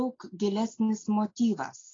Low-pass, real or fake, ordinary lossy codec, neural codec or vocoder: 7.2 kHz; real; AAC, 32 kbps; none